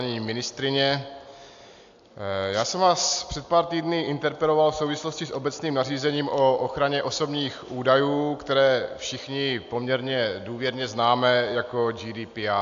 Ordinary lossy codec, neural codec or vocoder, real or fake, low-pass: AAC, 64 kbps; none; real; 7.2 kHz